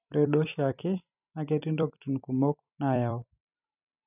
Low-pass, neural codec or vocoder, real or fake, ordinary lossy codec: 3.6 kHz; vocoder, 44.1 kHz, 128 mel bands every 256 samples, BigVGAN v2; fake; none